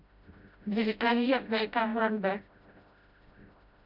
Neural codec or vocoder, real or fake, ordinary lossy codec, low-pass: codec, 16 kHz, 0.5 kbps, FreqCodec, smaller model; fake; none; 5.4 kHz